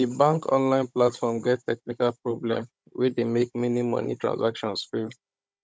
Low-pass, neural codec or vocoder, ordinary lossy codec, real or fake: none; codec, 16 kHz, 16 kbps, FunCodec, trained on Chinese and English, 50 frames a second; none; fake